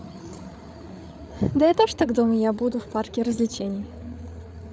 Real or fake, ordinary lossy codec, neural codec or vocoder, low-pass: fake; none; codec, 16 kHz, 8 kbps, FreqCodec, larger model; none